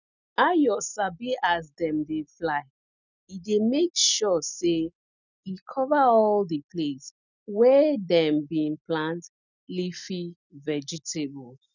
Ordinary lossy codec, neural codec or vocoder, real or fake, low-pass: none; none; real; 7.2 kHz